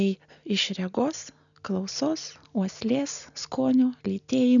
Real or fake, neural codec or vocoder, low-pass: real; none; 7.2 kHz